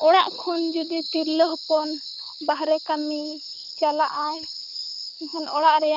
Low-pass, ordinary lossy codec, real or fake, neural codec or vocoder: 5.4 kHz; none; fake; codec, 24 kHz, 6 kbps, HILCodec